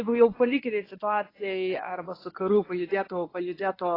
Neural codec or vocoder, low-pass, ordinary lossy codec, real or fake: codec, 24 kHz, 0.9 kbps, WavTokenizer, medium speech release version 1; 5.4 kHz; AAC, 24 kbps; fake